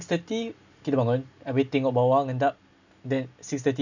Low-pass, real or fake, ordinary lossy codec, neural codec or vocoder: 7.2 kHz; real; none; none